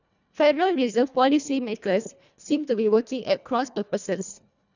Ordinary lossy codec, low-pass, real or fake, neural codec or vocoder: none; 7.2 kHz; fake; codec, 24 kHz, 1.5 kbps, HILCodec